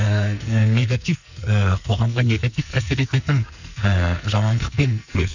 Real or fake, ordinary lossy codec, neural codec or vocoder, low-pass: fake; none; codec, 44.1 kHz, 2.6 kbps, SNAC; 7.2 kHz